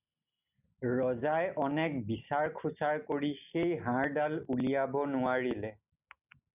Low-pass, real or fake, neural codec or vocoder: 3.6 kHz; real; none